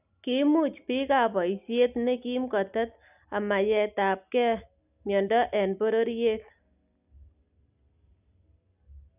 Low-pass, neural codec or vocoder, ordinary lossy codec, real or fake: 3.6 kHz; none; none; real